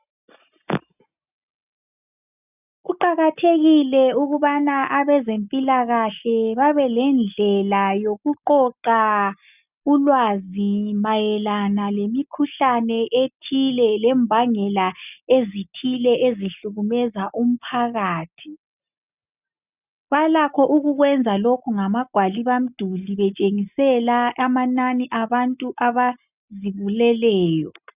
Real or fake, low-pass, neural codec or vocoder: real; 3.6 kHz; none